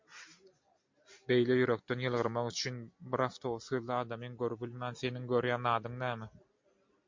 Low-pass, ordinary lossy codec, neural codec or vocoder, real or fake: 7.2 kHz; MP3, 48 kbps; none; real